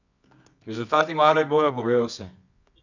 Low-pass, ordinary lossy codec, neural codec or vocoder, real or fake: 7.2 kHz; none; codec, 24 kHz, 0.9 kbps, WavTokenizer, medium music audio release; fake